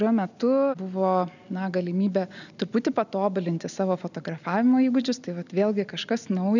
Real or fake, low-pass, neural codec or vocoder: real; 7.2 kHz; none